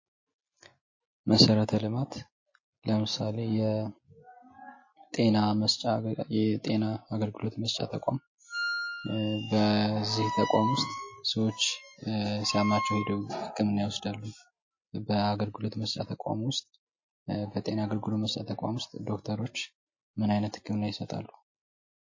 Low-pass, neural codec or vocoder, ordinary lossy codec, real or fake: 7.2 kHz; none; MP3, 32 kbps; real